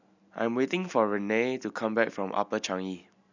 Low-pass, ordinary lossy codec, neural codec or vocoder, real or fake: 7.2 kHz; none; none; real